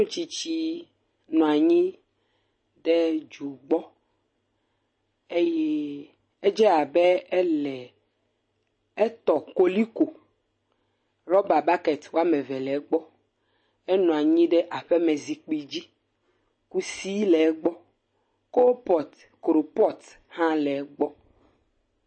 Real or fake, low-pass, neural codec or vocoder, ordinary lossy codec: real; 9.9 kHz; none; MP3, 32 kbps